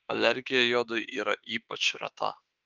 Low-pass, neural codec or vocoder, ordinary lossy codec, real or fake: 7.2 kHz; autoencoder, 48 kHz, 32 numbers a frame, DAC-VAE, trained on Japanese speech; Opus, 24 kbps; fake